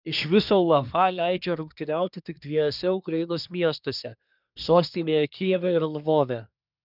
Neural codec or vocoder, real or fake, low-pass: codec, 24 kHz, 1 kbps, SNAC; fake; 5.4 kHz